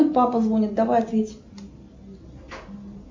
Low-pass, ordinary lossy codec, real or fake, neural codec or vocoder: 7.2 kHz; MP3, 64 kbps; real; none